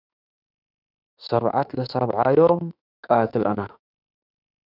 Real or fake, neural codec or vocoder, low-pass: fake; autoencoder, 48 kHz, 32 numbers a frame, DAC-VAE, trained on Japanese speech; 5.4 kHz